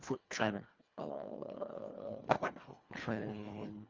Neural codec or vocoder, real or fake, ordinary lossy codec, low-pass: codec, 24 kHz, 1.5 kbps, HILCodec; fake; Opus, 32 kbps; 7.2 kHz